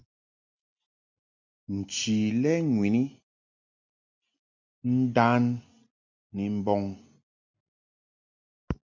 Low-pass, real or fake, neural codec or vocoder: 7.2 kHz; real; none